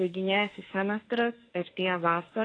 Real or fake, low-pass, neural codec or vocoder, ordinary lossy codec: fake; 9.9 kHz; autoencoder, 48 kHz, 32 numbers a frame, DAC-VAE, trained on Japanese speech; AAC, 32 kbps